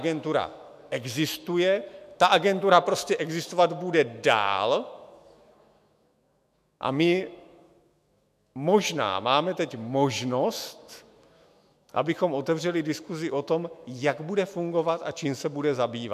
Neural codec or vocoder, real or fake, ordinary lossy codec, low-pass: autoencoder, 48 kHz, 128 numbers a frame, DAC-VAE, trained on Japanese speech; fake; MP3, 96 kbps; 14.4 kHz